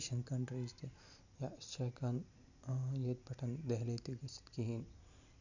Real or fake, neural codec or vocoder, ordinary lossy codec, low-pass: real; none; none; 7.2 kHz